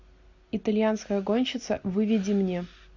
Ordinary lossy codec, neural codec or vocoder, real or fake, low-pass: AAC, 48 kbps; none; real; 7.2 kHz